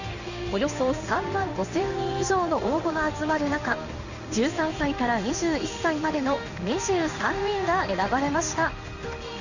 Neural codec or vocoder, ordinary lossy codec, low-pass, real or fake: codec, 16 kHz in and 24 kHz out, 1 kbps, XY-Tokenizer; none; 7.2 kHz; fake